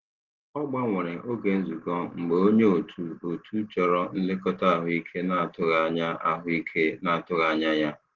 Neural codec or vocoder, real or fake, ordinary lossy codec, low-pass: none; real; Opus, 16 kbps; 7.2 kHz